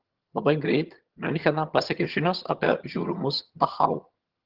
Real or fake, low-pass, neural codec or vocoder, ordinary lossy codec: fake; 5.4 kHz; vocoder, 22.05 kHz, 80 mel bands, HiFi-GAN; Opus, 16 kbps